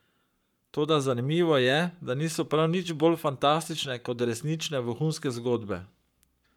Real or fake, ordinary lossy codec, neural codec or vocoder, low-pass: fake; none; codec, 44.1 kHz, 7.8 kbps, Pupu-Codec; 19.8 kHz